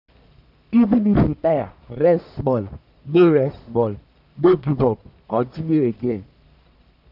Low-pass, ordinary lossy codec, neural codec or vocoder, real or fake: 5.4 kHz; none; codec, 44.1 kHz, 1.7 kbps, Pupu-Codec; fake